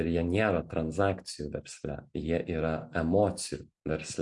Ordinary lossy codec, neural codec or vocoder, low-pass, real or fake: MP3, 64 kbps; none; 10.8 kHz; real